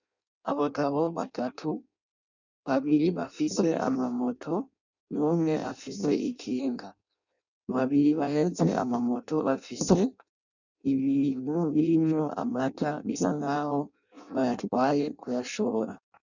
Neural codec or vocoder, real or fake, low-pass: codec, 16 kHz in and 24 kHz out, 0.6 kbps, FireRedTTS-2 codec; fake; 7.2 kHz